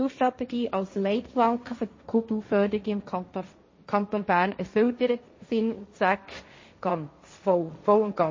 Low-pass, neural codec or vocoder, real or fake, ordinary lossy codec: 7.2 kHz; codec, 16 kHz, 1.1 kbps, Voila-Tokenizer; fake; MP3, 32 kbps